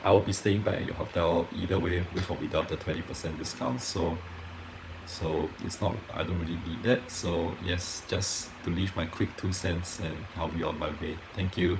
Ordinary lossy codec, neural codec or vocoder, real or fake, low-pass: none; codec, 16 kHz, 16 kbps, FunCodec, trained on LibriTTS, 50 frames a second; fake; none